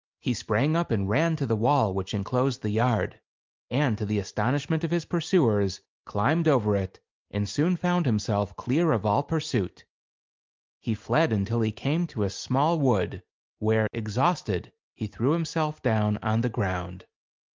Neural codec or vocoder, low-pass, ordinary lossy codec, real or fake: none; 7.2 kHz; Opus, 24 kbps; real